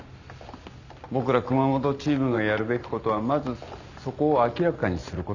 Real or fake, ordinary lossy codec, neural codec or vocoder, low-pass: real; none; none; 7.2 kHz